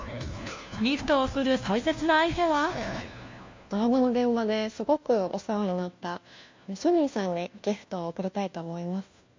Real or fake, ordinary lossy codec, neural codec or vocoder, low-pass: fake; MP3, 48 kbps; codec, 16 kHz, 1 kbps, FunCodec, trained on LibriTTS, 50 frames a second; 7.2 kHz